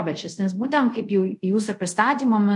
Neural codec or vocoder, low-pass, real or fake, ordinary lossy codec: codec, 24 kHz, 0.5 kbps, DualCodec; 10.8 kHz; fake; MP3, 64 kbps